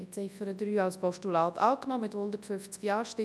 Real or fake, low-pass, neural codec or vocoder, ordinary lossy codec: fake; none; codec, 24 kHz, 0.9 kbps, WavTokenizer, large speech release; none